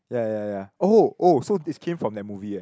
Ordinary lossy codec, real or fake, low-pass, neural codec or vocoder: none; real; none; none